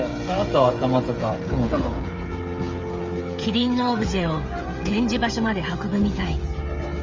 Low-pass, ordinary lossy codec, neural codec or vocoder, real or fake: 7.2 kHz; Opus, 32 kbps; codec, 16 kHz, 16 kbps, FreqCodec, smaller model; fake